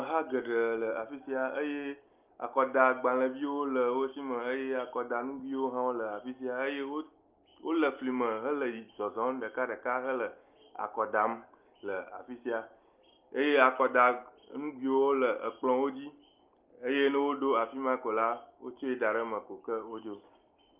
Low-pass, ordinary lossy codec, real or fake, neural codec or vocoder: 3.6 kHz; Opus, 24 kbps; real; none